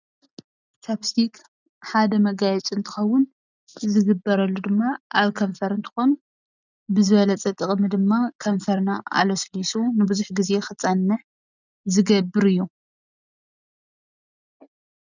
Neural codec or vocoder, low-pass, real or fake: none; 7.2 kHz; real